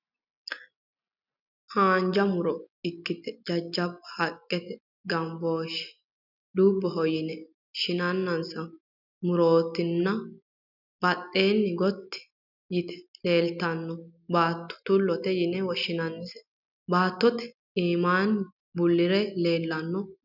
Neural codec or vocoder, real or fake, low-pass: none; real; 5.4 kHz